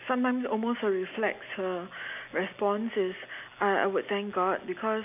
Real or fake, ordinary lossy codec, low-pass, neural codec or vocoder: real; AAC, 32 kbps; 3.6 kHz; none